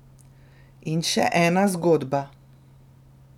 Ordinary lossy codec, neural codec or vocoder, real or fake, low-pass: none; none; real; 19.8 kHz